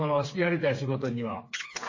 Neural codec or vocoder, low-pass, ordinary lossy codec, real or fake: codec, 16 kHz, 4 kbps, FreqCodec, smaller model; 7.2 kHz; MP3, 32 kbps; fake